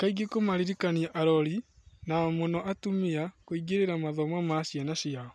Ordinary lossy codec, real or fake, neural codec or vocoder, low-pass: none; real; none; none